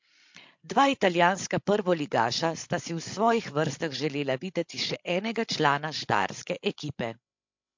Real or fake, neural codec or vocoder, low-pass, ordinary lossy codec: fake; codec, 16 kHz, 16 kbps, FreqCodec, larger model; 7.2 kHz; MP3, 48 kbps